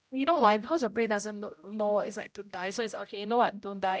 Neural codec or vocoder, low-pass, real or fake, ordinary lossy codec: codec, 16 kHz, 0.5 kbps, X-Codec, HuBERT features, trained on general audio; none; fake; none